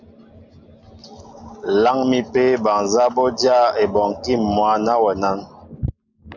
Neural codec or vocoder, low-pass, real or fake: none; 7.2 kHz; real